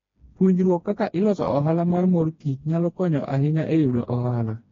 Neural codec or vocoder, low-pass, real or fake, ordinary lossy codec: codec, 16 kHz, 2 kbps, FreqCodec, smaller model; 7.2 kHz; fake; AAC, 32 kbps